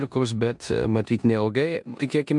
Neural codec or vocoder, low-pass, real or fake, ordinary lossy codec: codec, 16 kHz in and 24 kHz out, 0.9 kbps, LongCat-Audio-Codec, four codebook decoder; 10.8 kHz; fake; MP3, 64 kbps